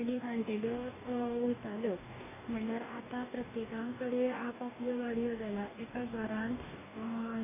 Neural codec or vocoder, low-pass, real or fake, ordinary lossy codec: codec, 44.1 kHz, 2.6 kbps, DAC; 3.6 kHz; fake; none